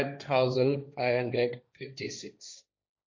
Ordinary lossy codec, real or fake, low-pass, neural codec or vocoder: MP3, 48 kbps; fake; 7.2 kHz; codec, 16 kHz, 1.1 kbps, Voila-Tokenizer